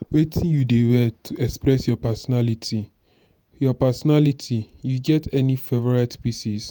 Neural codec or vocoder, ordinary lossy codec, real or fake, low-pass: vocoder, 48 kHz, 128 mel bands, Vocos; none; fake; none